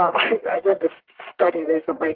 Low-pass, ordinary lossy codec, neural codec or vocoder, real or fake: 5.4 kHz; Opus, 16 kbps; codec, 44.1 kHz, 1.7 kbps, Pupu-Codec; fake